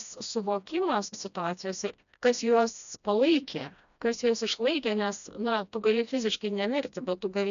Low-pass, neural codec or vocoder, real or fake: 7.2 kHz; codec, 16 kHz, 1 kbps, FreqCodec, smaller model; fake